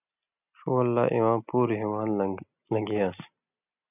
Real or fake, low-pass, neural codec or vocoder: real; 3.6 kHz; none